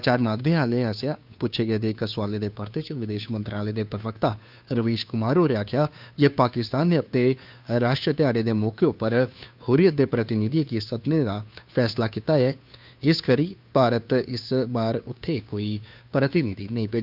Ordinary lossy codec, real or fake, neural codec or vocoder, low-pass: none; fake; codec, 16 kHz, 2 kbps, FunCodec, trained on Chinese and English, 25 frames a second; 5.4 kHz